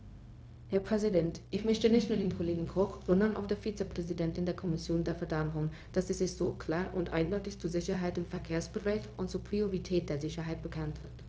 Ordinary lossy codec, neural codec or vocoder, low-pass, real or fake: none; codec, 16 kHz, 0.4 kbps, LongCat-Audio-Codec; none; fake